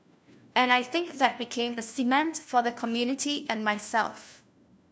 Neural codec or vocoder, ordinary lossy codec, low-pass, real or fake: codec, 16 kHz, 1 kbps, FunCodec, trained on LibriTTS, 50 frames a second; none; none; fake